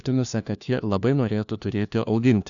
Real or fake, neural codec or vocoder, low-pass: fake; codec, 16 kHz, 1 kbps, FunCodec, trained on LibriTTS, 50 frames a second; 7.2 kHz